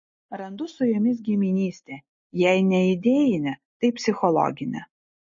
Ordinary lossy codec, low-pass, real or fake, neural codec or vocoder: MP3, 32 kbps; 7.2 kHz; real; none